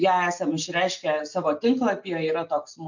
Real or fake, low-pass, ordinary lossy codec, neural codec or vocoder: real; 7.2 kHz; MP3, 64 kbps; none